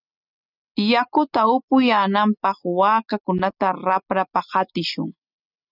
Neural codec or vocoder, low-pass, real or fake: none; 5.4 kHz; real